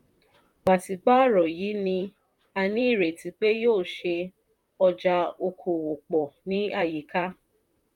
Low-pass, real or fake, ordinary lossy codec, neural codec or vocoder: 19.8 kHz; fake; none; vocoder, 44.1 kHz, 128 mel bands, Pupu-Vocoder